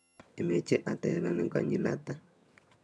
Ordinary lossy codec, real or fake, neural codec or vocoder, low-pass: none; fake; vocoder, 22.05 kHz, 80 mel bands, HiFi-GAN; none